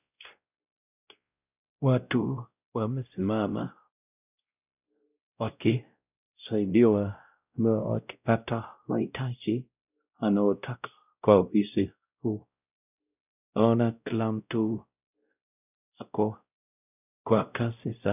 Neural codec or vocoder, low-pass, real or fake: codec, 16 kHz, 0.5 kbps, X-Codec, WavLM features, trained on Multilingual LibriSpeech; 3.6 kHz; fake